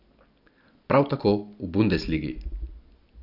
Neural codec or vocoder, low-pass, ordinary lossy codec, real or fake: none; 5.4 kHz; none; real